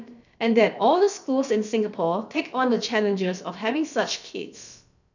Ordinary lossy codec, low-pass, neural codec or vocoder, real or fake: none; 7.2 kHz; codec, 16 kHz, about 1 kbps, DyCAST, with the encoder's durations; fake